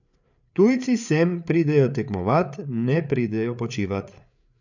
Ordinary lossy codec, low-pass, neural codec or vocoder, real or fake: none; 7.2 kHz; codec, 16 kHz, 8 kbps, FreqCodec, larger model; fake